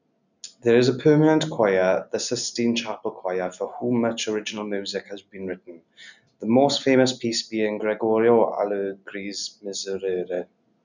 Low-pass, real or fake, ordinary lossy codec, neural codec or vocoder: 7.2 kHz; real; none; none